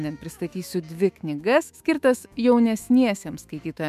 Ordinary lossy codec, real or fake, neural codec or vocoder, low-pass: MP3, 96 kbps; fake; autoencoder, 48 kHz, 128 numbers a frame, DAC-VAE, trained on Japanese speech; 14.4 kHz